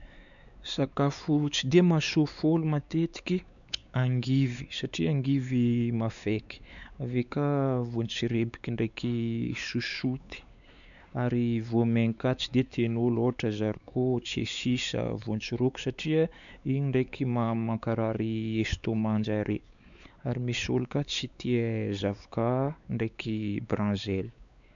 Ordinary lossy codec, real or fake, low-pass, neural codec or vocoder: none; fake; 7.2 kHz; codec, 16 kHz, 4 kbps, X-Codec, WavLM features, trained on Multilingual LibriSpeech